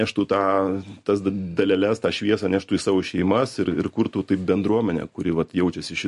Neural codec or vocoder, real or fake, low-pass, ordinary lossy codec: none; real; 14.4 kHz; MP3, 48 kbps